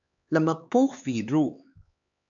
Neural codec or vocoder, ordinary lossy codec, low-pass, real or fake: codec, 16 kHz, 4 kbps, X-Codec, HuBERT features, trained on LibriSpeech; AAC, 64 kbps; 7.2 kHz; fake